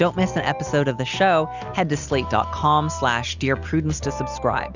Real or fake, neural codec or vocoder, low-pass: real; none; 7.2 kHz